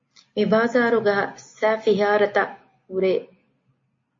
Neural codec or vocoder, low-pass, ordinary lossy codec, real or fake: none; 7.2 kHz; MP3, 32 kbps; real